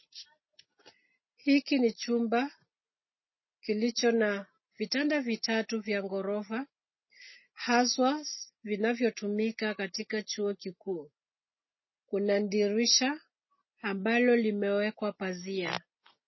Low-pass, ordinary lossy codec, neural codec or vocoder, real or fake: 7.2 kHz; MP3, 24 kbps; none; real